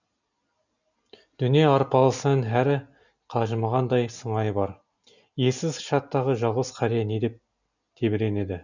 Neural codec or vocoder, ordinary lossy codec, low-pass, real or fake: none; none; 7.2 kHz; real